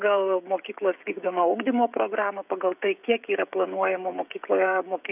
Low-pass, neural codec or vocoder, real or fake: 3.6 kHz; codec, 16 kHz, 16 kbps, FreqCodec, smaller model; fake